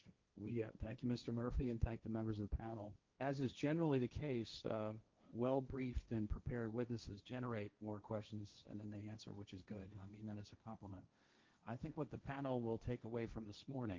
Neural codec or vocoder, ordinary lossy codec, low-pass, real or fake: codec, 16 kHz, 1.1 kbps, Voila-Tokenizer; Opus, 24 kbps; 7.2 kHz; fake